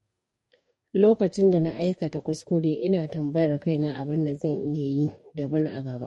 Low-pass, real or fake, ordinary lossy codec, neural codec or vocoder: 19.8 kHz; fake; MP3, 48 kbps; codec, 44.1 kHz, 2.6 kbps, DAC